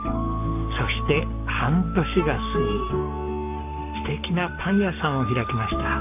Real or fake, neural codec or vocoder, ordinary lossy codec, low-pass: fake; codec, 44.1 kHz, 7.8 kbps, Pupu-Codec; none; 3.6 kHz